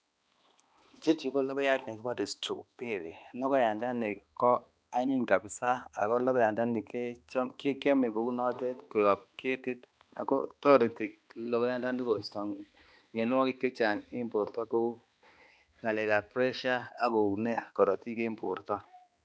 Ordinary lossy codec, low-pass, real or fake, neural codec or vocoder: none; none; fake; codec, 16 kHz, 2 kbps, X-Codec, HuBERT features, trained on balanced general audio